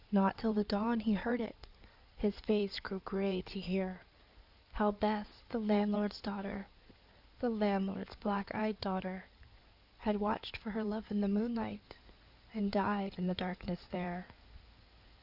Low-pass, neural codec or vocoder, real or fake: 5.4 kHz; codec, 16 kHz in and 24 kHz out, 2.2 kbps, FireRedTTS-2 codec; fake